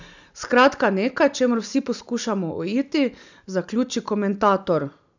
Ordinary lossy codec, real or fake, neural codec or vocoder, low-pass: none; real; none; 7.2 kHz